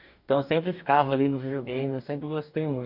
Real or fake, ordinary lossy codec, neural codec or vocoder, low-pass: fake; none; codec, 44.1 kHz, 2.6 kbps, DAC; 5.4 kHz